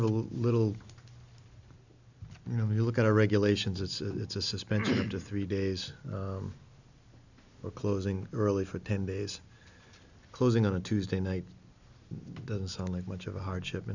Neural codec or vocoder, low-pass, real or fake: none; 7.2 kHz; real